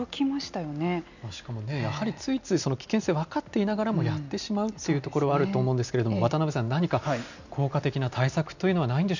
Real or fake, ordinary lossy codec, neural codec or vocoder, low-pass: real; none; none; 7.2 kHz